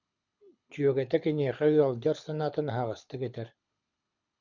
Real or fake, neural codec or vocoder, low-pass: fake; codec, 24 kHz, 6 kbps, HILCodec; 7.2 kHz